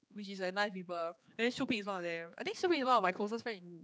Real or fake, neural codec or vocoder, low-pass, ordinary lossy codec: fake; codec, 16 kHz, 4 kbps, X-Codec, HuBERT features, trained on general audio; none; none